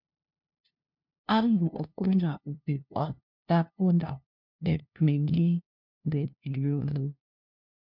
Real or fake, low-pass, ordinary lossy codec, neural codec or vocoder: fake; 5.4 kHz; MP3, 48 kbps; codec, 16 kHz, 0.5 kbps, FunCodec, trained on LibriTTS, 25 frames a second